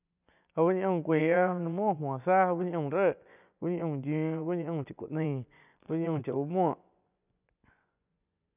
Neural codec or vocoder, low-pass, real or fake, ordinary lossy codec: vocoder, 24 kHz, 100 mel bands, Vocos; 3.6 kHz; fake; none